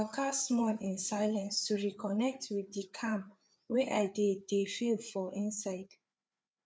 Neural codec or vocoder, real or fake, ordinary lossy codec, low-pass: codec, 16 kHz, 4 kbps, FreqCodec, larger model; fake; none; none